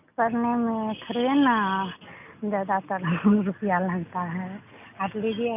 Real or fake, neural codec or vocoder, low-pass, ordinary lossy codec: real; none; 3.6 kHz; none